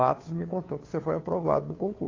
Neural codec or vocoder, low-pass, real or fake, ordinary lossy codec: codec, 16 kHz, 2 kbps, FunCodec, trained on Chinese and English, 25 frames a second; 7.2 kHz; fake; AAC, 32 kbps